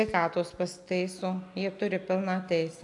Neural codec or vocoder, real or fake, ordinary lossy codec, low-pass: none; real; AAC, 64 kbps; 10.8 kHz